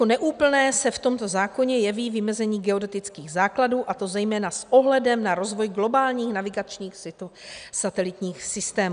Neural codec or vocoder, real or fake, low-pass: none; real; 9.9 kHz